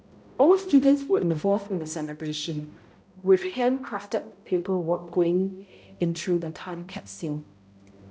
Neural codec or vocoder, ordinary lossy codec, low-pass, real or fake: codec, 16 kHz, 0.5 kbps, X-Codec, HuBERT features, trained on balanced general audio; none; none; fake